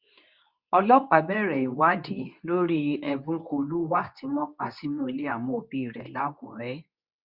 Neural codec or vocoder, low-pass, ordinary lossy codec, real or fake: codec, 24 kHz, 0.9 kbps, WavTokenizer, medium speech release version 2; 5.4 kHz; Opus, 64 kbps; fake